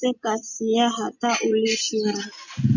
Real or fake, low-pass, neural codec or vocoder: real; 7.2 kHz; none